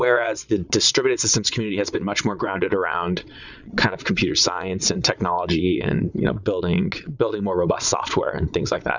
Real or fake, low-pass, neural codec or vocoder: fake; 7.2 kHz; vocoder, 22.05 kHz, 80 mel bands, Vocos